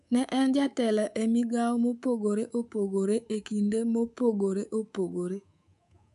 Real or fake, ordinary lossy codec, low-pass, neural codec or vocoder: fake; none; 10.8 kHz; codec, 24 kHz, 3.1 kbps, DualCodec